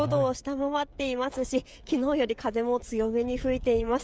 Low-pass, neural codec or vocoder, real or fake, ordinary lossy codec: none; codec, 16 kHz, 16 kbps, FreqCodec, smaller model; fake; none